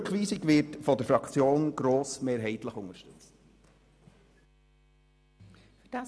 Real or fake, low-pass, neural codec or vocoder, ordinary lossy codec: real; none; none; none